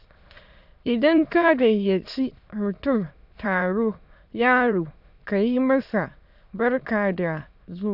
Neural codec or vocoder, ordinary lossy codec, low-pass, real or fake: autoencoder, 22.05 kHz, a latent of 192 numbers a frame, VITS, trained on many speakers; none; 5.4 kHz; fake